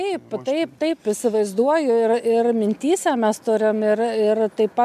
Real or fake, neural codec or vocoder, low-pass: real; none; 14.4 kHz